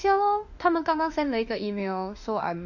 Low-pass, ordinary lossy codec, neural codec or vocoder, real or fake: 7.2 kHz; none; autoencoder, 48 kHz, 32 numbers a frame, DAC-VAE, trained on Japanese speech; fake